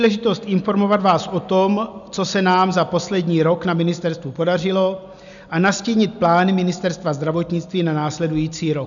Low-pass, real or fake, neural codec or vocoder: 7.2 kHz; real; none